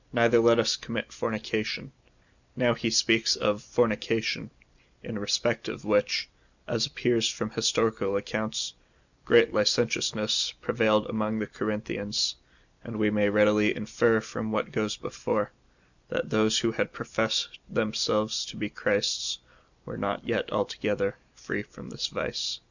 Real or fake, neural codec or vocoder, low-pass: real; none; 7.2 kHz